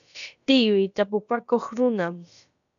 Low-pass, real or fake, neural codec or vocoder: 7.2 kHz; fake; codec, 16 kHz, about 1 kbps, DyCAST, with the encoder's durations